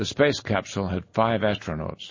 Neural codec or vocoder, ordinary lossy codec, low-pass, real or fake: none; MP3, 32 kbps; 7.2 kHz; real